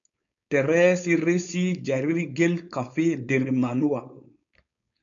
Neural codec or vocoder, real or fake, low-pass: codec, 16 kHz, 4.8 kbps, FACodec; fake; 7.2 kHz